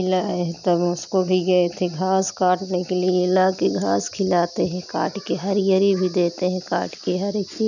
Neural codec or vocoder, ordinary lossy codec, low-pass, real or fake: none; none; 7.2 kHz; real